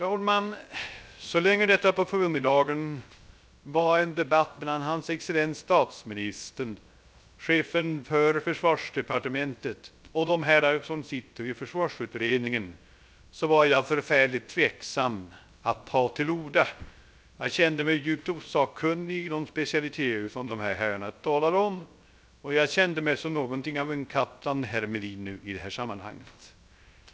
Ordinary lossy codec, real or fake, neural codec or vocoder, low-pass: none; fake; codec, 16 kHz, 0.3 kbps, FocalCodec; none